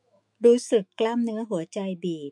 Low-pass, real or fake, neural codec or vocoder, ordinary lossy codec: 10.8 kHz; fake; autoencoder, 48 kHz, 128 numbers a frame, DAC-VAE, trained on Japanese speech; MP3, 64 kbps